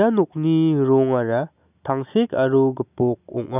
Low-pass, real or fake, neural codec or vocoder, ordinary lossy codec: 3.6 kHz; real; none; none